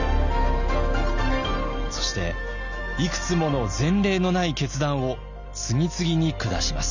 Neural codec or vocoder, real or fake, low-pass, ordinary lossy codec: none; real; 7.2 kHz; none